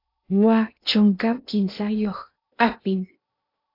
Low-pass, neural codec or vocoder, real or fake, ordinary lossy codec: 5.4 kHz; codec, 16 kHz in and 24 kHz out, 0.8 kbps, FocalCodec, streaming, 65536 codes; fake; AAC, 32 kbps